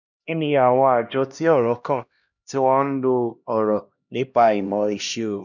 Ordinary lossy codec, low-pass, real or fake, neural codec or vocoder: none; 7.2 kHz; fake; codec, 16 kHz, 1 kbps, X-Codec, HuBERT features, trained on LibriSpeech